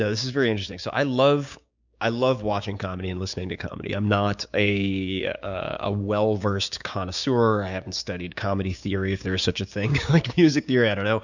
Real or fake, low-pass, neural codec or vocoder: fake; 7.2 kHz; codec, 16 kHz, 6 kbps, DAC